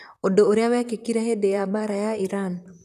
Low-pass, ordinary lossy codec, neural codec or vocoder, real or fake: 14.4 kHz; none; vocoder, 44.1 kHz, 128 mel bands, Pupu-Vocoder; fake